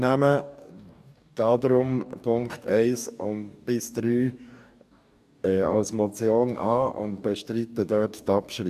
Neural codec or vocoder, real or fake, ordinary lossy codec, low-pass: codec, 44.1 kHz, 2.6 kbps, DAC; fake; none; 14.4 kHz